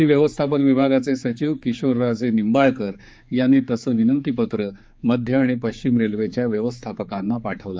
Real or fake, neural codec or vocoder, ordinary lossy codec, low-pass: fake; codec, 16 kHz, 4 kbps, X-Codec, HuBERT features, trained on general audio; none; none